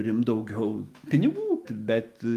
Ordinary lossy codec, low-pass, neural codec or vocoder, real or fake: Opus, 32 kbps; 14.4 kHz; autoencoder, 48 kHz, 128 numbers a frame, DAC-VAE, trained on Japanese speech; fake